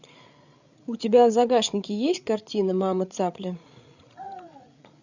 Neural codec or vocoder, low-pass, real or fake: codec, 16 kHz, 8 kbps, FreqCodec, larger model; 7.2 kHz; fake